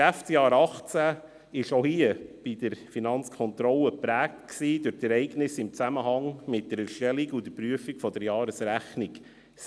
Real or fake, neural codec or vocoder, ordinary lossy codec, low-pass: real; none; none; none